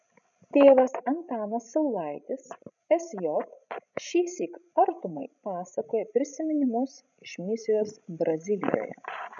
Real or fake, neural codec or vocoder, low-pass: fake; codec, 16 kHz, 16 kbps, FreqCodec, larger model; 7.2 kHz